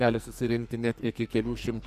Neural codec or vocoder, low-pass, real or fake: codec, 44.1 kHz, 2.6 kbps, SNAC; 14.4 kHz; fake